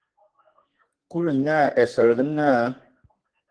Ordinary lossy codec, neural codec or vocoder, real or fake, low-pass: Opus, 16 kbps; codec, 32 kHz, 1.9 kbps, SNAC; fake; 9.9 kHz